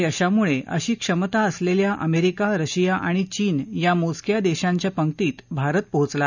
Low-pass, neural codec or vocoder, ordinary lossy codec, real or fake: 7.2 kHz; none; none; real